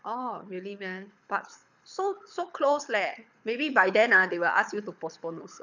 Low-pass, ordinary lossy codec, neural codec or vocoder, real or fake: 7.2 kHz; none; codec, 24 kHz, 6 kbps, HILCodec; fake